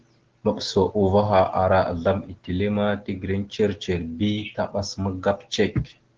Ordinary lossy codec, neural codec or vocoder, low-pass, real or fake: Opus, 16 kbps; none; 7.2 kHz; real